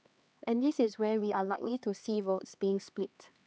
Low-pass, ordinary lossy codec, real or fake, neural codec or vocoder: none; none; fake; codec, 16 kHz, 2 kbps, X-Codec, HuBERT features, trained on LibriSpeech